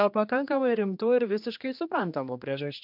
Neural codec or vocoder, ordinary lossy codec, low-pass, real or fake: codec, 16 kHz, 2 kbps, X-Codec, HuBERT features, trained on general audio; MP3, 48 kbps; 5.4 kHz; fake